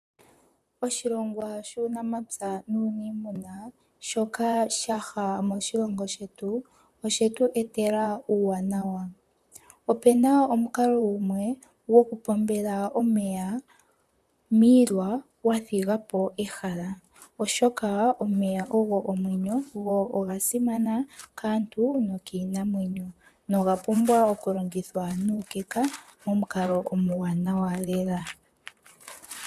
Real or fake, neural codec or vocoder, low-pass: fake; vocoder, 44.1 kHz, 128 mel bands, Pupu-Vocoder; 14.4 kHz